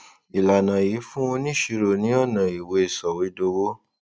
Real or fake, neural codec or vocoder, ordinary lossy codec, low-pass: real; none; none; none